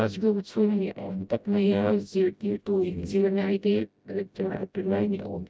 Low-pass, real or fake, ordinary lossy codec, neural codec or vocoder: none; fake; none; codec, 16 kHz, 0.5 kbps, FreqCodec, smaller model